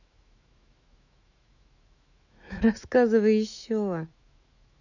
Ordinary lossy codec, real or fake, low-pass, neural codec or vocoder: none; fake; 7.2 kHz; autoencoder, 48 kHz, 128 numbers a frame, DAC-VAE, trained on Japanese speech